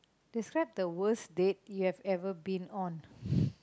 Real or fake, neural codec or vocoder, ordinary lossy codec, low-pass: real; none; none; none